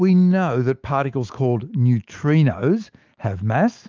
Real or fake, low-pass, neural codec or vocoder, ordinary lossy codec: fake; 7.2 kHz; autoencoder, 48 kHz, 128 numbers a frame, DAC-VAE, trained on Japanese speech; Opus, 32 kbps